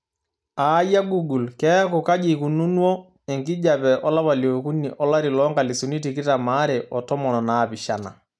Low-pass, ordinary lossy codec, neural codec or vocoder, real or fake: none; none; none; real